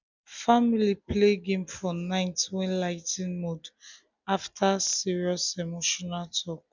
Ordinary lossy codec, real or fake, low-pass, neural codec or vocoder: none; real; 7.2 kHz; none